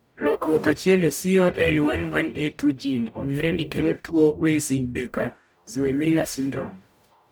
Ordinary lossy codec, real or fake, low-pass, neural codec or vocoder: none; fake; none; codec, 44.1 kHz, 0.9 kbps, DAC